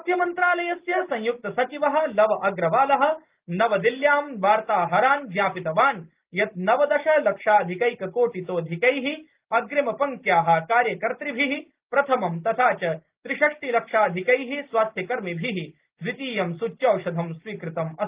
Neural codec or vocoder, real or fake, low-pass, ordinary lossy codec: none; real; 3.6 kHz; Opus, 32 kbps